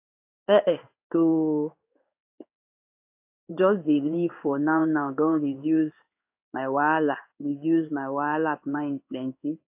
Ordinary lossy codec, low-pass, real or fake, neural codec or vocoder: AAC, 32 kbps; 3.6 kHz; fake; codec, 16 kHz in and 24 kHz out, 1 kbps, XY-Tokenizer